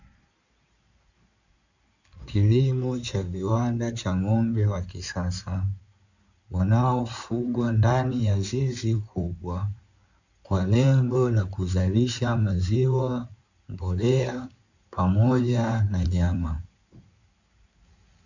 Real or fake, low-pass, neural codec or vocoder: fake; 7.2 kHz; codec, 16 kHz in and 24 kHz out, 2.2 kbps, FireRedTTS-2 codec